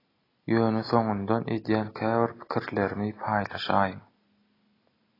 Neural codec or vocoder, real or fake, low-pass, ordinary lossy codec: none; real; 5.4 kHz; AAC, 24 kbps